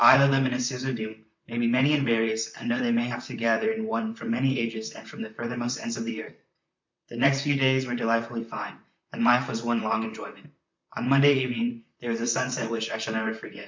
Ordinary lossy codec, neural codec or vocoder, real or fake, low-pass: MP3, 64 kbps; vocoder, 44.1 kHz, 128 mel bands, Pupu-Vocoder; fake; 7.2 kHz